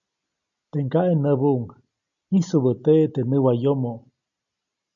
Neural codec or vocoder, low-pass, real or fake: none; 7.2 kHz; real